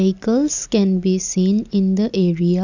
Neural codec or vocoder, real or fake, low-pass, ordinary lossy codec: none; real; 7.2 kHz; none